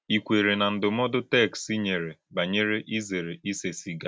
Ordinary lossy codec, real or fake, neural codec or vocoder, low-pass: none; real; none; none